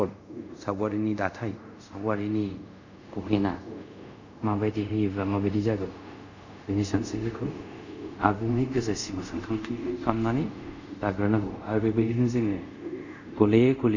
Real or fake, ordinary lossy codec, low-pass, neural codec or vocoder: fake; none; 7.2 kHz; codec, 24 kHz, 0.5 kbps, DualCodec